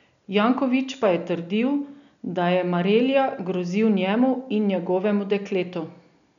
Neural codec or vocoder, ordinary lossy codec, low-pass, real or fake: none; none; 7.2 kHz; real